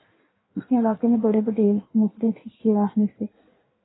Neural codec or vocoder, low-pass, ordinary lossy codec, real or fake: codec, 16 kHz in and 24 kHz out, 1.1 kbps, FireRedTTS-2 codec; 7.2 kHz; AAC, 16 kbps; fake